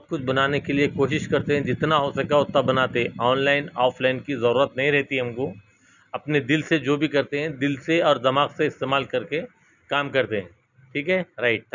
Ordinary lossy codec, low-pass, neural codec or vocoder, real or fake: none; 7.2 kHz; none; real